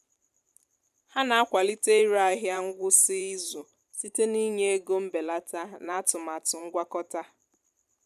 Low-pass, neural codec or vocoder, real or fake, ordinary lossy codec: 14.4 kHz; vocoder, 44.1 kHz, 128 mel bands every 512 samples, BigVGAN v2; fake; none